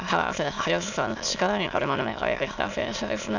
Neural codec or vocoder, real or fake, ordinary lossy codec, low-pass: autoencoder, 22.05 kHz, a latent of 192 numbers a frame, VITS, trained on many speakers; fake; none; 7.2 kHz